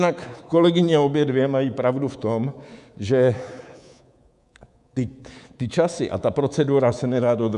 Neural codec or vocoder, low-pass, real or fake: codec, 24 kHz, 3.1 kbps, DualCodec; 10.8 kHz; fake